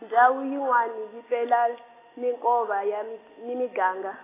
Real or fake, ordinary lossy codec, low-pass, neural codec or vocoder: real; AAC, 16 kbps; 3.6 kHz; none